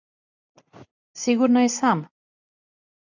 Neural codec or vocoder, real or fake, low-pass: none; real; 7.2 kHz